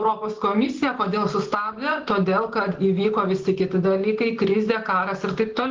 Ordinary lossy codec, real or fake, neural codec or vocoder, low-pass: Opus, 16 kbps; real; none; 7.2 kHz